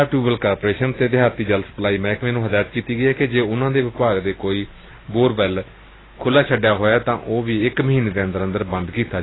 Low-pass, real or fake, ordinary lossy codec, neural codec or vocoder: 7.2 kHz; real; AAC, 16 kbps; none